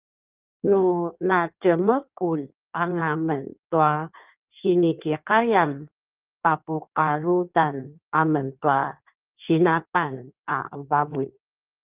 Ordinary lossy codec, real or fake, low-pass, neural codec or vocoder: Opus, 32 kbps; fake; 3.6 kHz; codec, 16 kHz in and 24 kHz out, 1.1 kbps, FireRedTTS-2 codec